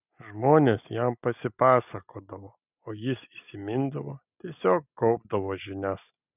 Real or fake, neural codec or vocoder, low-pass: real; none; 3.6 kHz